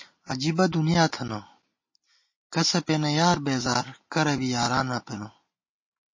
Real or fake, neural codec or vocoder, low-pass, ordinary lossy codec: real; none; 7.2 kHz; MP3, 32 kbps